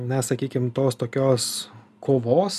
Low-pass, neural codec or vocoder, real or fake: 14.4 kHz; none; real